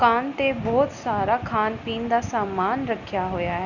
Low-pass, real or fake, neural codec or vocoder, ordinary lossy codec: 7.2 kHz; real; none; none